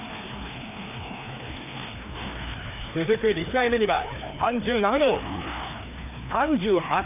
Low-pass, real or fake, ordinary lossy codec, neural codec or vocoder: 3.6 kHz; fake; none; codec, 16 kHz, 2 kbps, FreqCodec, larger model